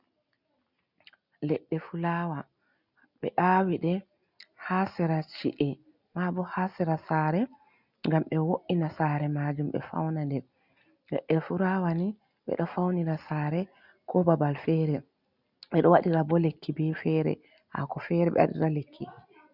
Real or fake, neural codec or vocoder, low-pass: real; none; 5.4 kHz